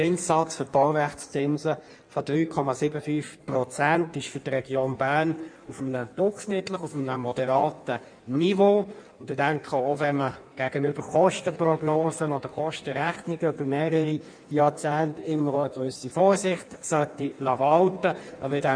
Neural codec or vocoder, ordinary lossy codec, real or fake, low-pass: codec, 16 kHz in and 24 kHz out, 1.1 kbps, FireRedTTS-2 codec; none; fake; 9.9 kHz